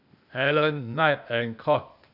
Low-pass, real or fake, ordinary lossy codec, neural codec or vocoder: 5.4 kHz; fake; none; codec, 16 kHz, 0.8 kbps, ZipCodec